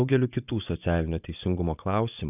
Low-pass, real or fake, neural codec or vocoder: 3.6 kHz; fake; vocoder, 24 kHz, 100 mel bands, Vocos